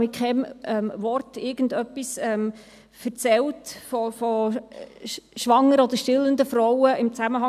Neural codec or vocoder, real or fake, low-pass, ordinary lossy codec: none; real; 14.4 kHz; none